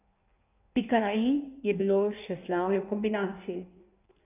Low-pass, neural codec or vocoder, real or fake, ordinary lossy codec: 3.6 kHz; codec, 16 kHz in and 24 kHz out, 1.1 kbps, FireRedTTS-2 codec; fake; none